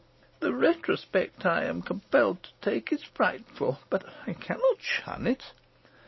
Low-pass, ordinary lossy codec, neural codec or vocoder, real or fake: 7.2 kHz; MP3, 24 kbps; none; real